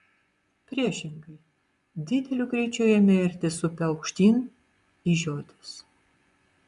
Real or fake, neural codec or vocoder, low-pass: fake; vocoder, 24 kHz, 100 mel bands, Vocos; 10.8 kHz